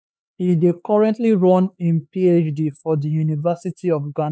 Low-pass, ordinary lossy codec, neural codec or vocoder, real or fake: none; none; codec, 16 kHz, 4 kbps, X-Codec, HuBERT features, trained on LibriSpeech; fake